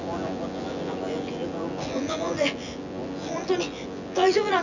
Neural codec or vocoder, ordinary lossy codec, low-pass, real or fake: vocoder, 24 kHz, 100 mel bands, Vocos; none; 7.2 kHz; fake